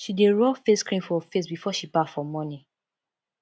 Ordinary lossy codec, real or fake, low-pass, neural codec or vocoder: none; real; none; none